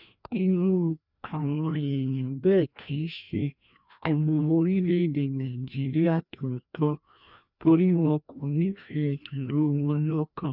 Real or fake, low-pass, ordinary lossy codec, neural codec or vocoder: fake; 5.4 kHz; none; codec, 16 kHz, 1 kbps, FreqCodec, larger model